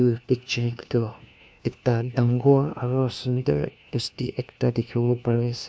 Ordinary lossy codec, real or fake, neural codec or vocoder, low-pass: none; fake; codec, 16 kHz, 1 kbps, FunCodec, trained on LibriTTS, 50 frames a second; none